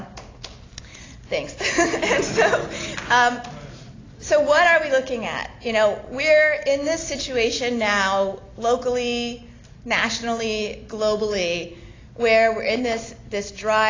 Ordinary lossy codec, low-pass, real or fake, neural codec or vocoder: AAC, 32 kbps; 7.2 kHz; real; none